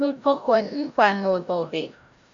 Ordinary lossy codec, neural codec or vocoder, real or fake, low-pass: Opus, 64 kbps; codec, 16 kHz, 0.5 kbps, FreqCodec, larger model; fake; 7.2 kHz